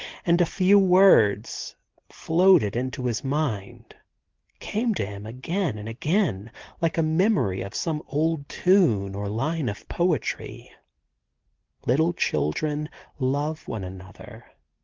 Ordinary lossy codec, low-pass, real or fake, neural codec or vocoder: Opus, 16 kbps; 7.2 kHz; real; none